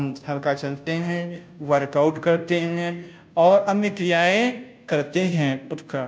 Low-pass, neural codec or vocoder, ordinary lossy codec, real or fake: none; codec, 16 kHz, 0.5 kbps, FunCodec, trained on Chinese and English, 25 frames a second; none; fake